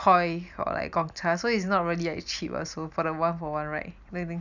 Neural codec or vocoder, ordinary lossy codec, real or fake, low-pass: none; none; real; 7.2 kHz